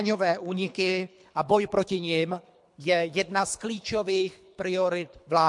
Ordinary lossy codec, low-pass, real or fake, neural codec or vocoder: MP3, 64 kbps; 10.8 kHz; fake; codec, 24 kHz, 3 kbps, HILCodec